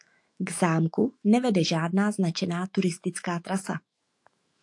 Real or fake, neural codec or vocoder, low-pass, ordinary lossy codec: fake; autoencoder, 48 kHz, 128 numbers a frame, DAC-VAE, trained on Japanese speech; 10.8 kHz; AAC, 64 kbps